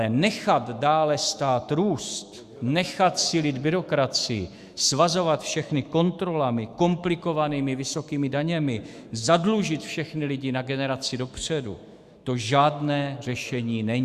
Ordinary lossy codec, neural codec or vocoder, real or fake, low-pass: Opus, 64 kbps; autoencoder, 48 kHz, 128 numbers a frame, DAC-VAE, trained on Japanese speech; fake; 14.4 kHz